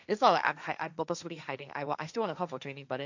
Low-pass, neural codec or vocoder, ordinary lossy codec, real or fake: 7.2 kHz; codec, 16 kHz, 1.1 kbps, Voila-Tokenizer; none; fake